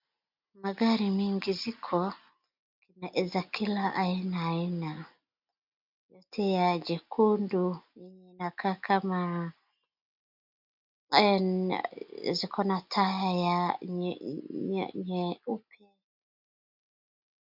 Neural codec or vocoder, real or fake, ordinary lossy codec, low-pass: none; real; MP3, 48 kbps; 5.4 kHz